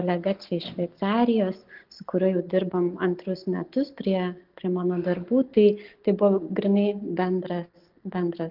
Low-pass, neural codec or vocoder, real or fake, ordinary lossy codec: 5.4 kHz; none; real; Opus, 32 kbps